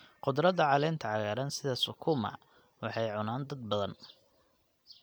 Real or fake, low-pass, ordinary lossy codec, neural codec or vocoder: real; none; none; none